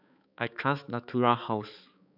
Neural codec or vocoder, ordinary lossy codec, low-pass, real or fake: codec, 16 kHz, 4 kbps, X-Codec, HuBERT features, trained on balanced general audio; none; 5.4 kHz; fake